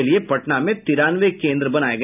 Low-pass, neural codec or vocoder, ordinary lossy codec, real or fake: 3.6 kHz; none; none; real